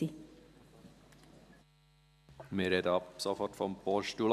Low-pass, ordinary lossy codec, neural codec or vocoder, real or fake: 14.4 kHz; none; none; real